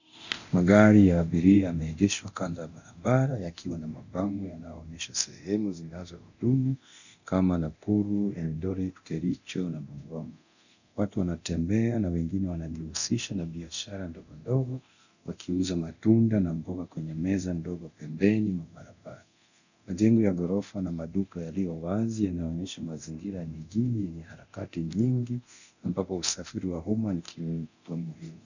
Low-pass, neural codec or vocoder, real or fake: 7.2 kHz; codec, 24 kHz, 0.9 kbps, DualCodec; fake